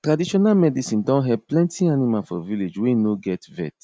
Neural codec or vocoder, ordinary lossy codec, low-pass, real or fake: none; none; none; real